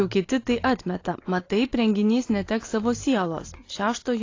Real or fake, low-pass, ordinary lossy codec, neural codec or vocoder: real; 7.2 kHz; AAC, 32 kbps; none